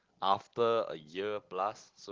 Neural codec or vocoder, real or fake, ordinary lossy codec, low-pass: none; real; Opus, 16 kbps; 7.2 kHz